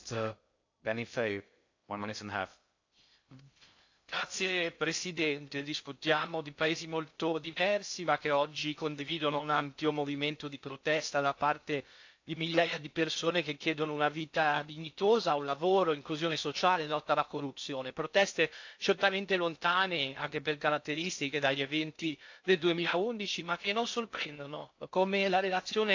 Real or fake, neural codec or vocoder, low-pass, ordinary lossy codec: fake; codec, 16 kHz in and 24 kHz out, 0.6 kbps, FocalCodec, streaming, 2048 codes; 7.2 kHz; AAC, 48 kbps